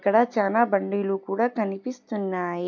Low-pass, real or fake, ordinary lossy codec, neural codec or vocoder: 7.2 kHz; real; none; none